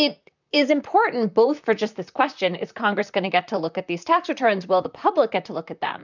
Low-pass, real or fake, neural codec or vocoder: 7.2 kHz; fake; vocoder, 44.1 kHz, 128 mel bands, Pupu-Vocoder